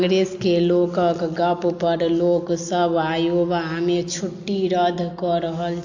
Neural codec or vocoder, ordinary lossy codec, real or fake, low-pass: none; AAC, 48 kbps; real; 7.2 kHz